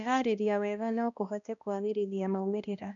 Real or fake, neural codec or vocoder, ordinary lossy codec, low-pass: fake; codec, 16 kHz, 1 kbps, X-Codec, HuBERT features, trained on balanced general audio; none; 7.2 kHz